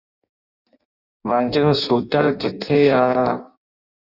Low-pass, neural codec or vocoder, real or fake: 5.4 kHz; codec, 16 kHz in and 24 kHz out, 0.6 kbps, FireRedTTS-2 codec; fake